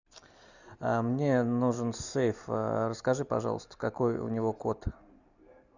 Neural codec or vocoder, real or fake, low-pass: none; real; 7.2 kHz